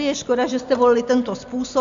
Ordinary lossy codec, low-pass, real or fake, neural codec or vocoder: AAC, 64 kbps; 7.2 kHz; real; none